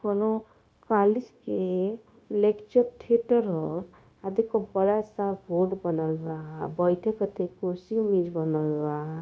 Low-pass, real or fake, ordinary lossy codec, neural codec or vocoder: none; fake; none; codec, 16 kHz, 0.9 kbps, LongCat-Audio-Codec